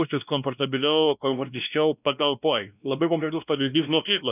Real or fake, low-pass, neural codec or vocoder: fake; 3.6 kHz; codec, 16 kHz, 1 kbps, X-Codec, WavLM features, trained on Multilingual LibriSpeech